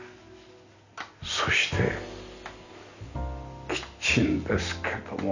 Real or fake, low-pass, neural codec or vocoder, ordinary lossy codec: real; 7.2 kHz; none; none